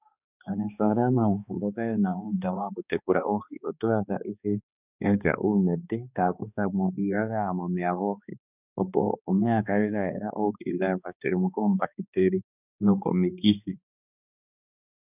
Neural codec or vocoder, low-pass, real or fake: codec, 16 kHz, 2 kbps, X-Codec, HuBERT features, trained on balanced general audio; 3.6 kHz; fake